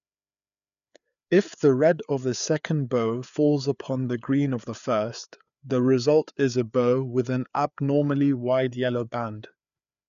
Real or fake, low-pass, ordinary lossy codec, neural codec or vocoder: fake; 7.2 kHz; none; codec, 16 kHz, 4 kbps, FreqCodec, larger model